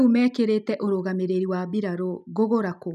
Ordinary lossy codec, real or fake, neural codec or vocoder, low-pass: none; real; none; 14.4 kHz